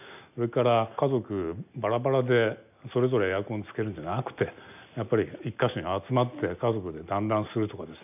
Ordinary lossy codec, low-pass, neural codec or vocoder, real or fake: none; 3.6 kHz; none; real